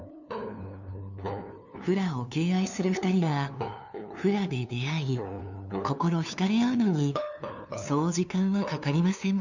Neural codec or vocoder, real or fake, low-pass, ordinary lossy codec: codec, 16 kHz, 2 kbps, FunCodec, trained on LibriTTS, 25 frames a second; fake; 7.2 kHz; none